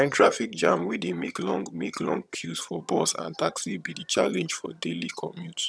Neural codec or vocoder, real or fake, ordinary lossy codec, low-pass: vocoder, 22.05 kHz, 80 mel bands, HiFi-GAN; fake; none; none